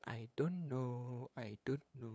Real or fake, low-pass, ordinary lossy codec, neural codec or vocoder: fake; none; none; codec, 16 kHz, 8 kbps, FunCodec, trained on LibriTTS, 25 frames a second